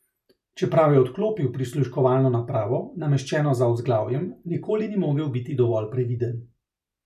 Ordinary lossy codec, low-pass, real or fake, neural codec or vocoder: none; 14.4 kHz; real; none